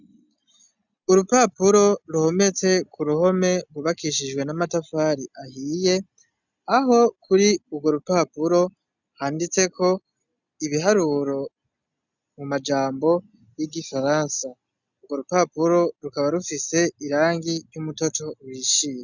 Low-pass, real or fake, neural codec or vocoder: 7.2 kHz; real; none